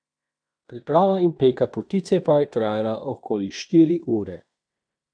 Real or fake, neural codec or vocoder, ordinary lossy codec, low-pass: fake; codec, 16 kHz in and 24 kHz out, 0.9 kbps, LongCat-Audio-Codec, fine tuned four codebook decoder; none; 9.9 kHz